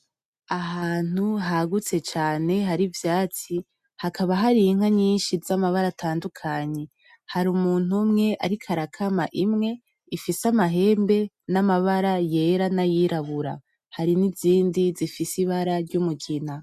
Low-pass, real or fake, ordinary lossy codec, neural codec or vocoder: 14.4 kHz; real; MP3, 96 kbps; none